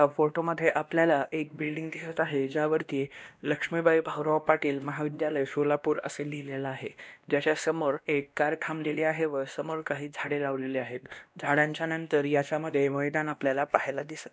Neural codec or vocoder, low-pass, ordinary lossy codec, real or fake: codec, 16 kHz, 1 kbps, X-Codec, WavLM features, trained on Multilingual LibriSpeech; none; none; fake